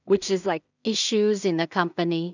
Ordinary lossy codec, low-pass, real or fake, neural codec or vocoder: none; 7.2 kHz; fake; codec, 16 kHz in and 24 kHz out, 0.4 kbps, LongCat-Audio-Codec, two codebook decoder